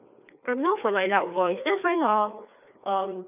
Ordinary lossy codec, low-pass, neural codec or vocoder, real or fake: none; 3.6 kHz; codec, 16 kHz, 2 kbps, FreqCodec, larger model; fake